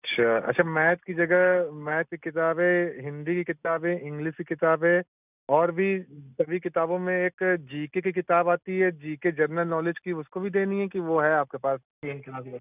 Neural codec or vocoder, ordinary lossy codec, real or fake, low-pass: none; none; real; 3.6 kHz